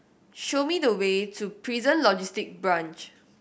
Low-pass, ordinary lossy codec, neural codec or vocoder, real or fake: none; none; none; real